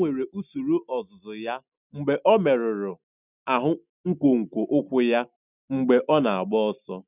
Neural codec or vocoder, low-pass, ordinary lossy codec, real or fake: none; 3.6 kHz; none; real